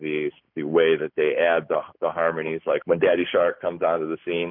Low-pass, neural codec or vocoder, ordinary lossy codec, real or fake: 5.4 kHz; none; MP3, 48 kbps; real